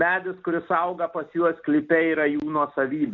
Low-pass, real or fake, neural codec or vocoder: 7.2 kHz; real; none